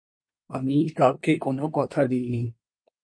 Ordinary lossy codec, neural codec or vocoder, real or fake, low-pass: MP3, 48 kbps; codec, 24 kHz, 1 kbps, SNAC; fake; 9.9 kHz